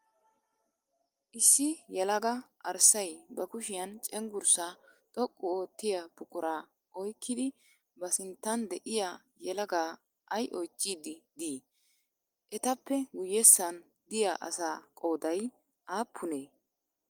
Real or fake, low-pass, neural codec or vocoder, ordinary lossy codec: real; 19.8 kHz; none; Opus, 32 kbps